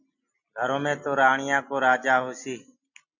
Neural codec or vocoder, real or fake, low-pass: none; real; 7.2 kHz